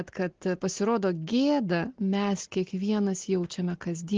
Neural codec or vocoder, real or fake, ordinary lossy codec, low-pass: none; real; Opus, 16 kbps; 7.2 kHz